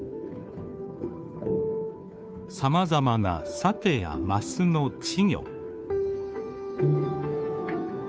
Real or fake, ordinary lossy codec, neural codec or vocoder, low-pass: fake; none; codec, 16 kHz, 2 kbps, FunCodec, trained on Chinese and English, 25 frames a second; none